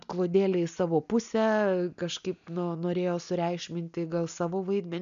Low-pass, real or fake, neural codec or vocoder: 7.2 kHz; real; none